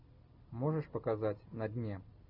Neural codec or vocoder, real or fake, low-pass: none; real; 5.4 kHz